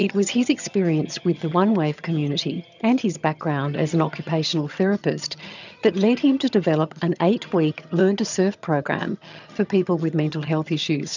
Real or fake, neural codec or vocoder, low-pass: fake; vocoder, 22.05 kHz, 80 mel bands, HiFi-GAN; 7.2 kHz